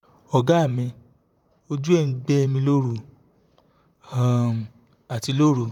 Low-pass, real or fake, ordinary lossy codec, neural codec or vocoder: 19.8 kHz; fake; none; vocoder, 44.1 kHz, 128 mel bands, Pupu-Vocoder